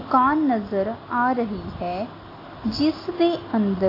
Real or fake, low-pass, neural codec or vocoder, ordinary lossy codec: real; 5.4 kHz; none; AAC, 24 kbps